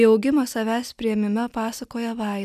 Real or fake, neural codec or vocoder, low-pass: real; none; 14.4 kHz